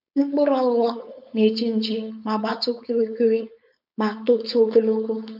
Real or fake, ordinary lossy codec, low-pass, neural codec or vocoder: fake; AAC, 48 kbps; 5.4 kHz; codec, 16 kHz, 4.8 kbps, FACodec